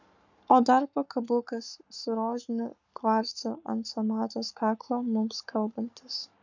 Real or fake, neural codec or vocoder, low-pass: fake; codec, 44.1 kHz, 7.8 kbps, Pupu-Codec; 7.2 kHz